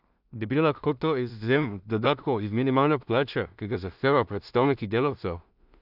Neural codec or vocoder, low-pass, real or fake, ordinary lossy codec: codec, 16 kHz in and 24 kHz out, 0.4 kbps, LongCat-Audio-Codec, two codebook decoder; 5.4 kHz; fake; none